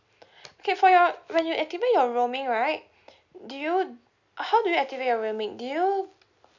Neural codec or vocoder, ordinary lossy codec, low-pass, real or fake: none; none; 7.2 kHz; real